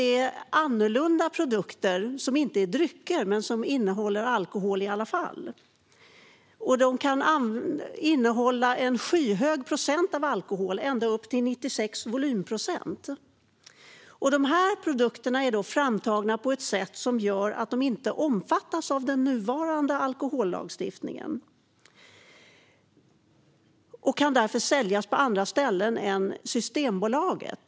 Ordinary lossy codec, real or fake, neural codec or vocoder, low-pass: none; real; none; none